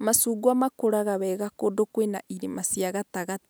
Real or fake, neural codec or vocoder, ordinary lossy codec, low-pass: real; none; none; none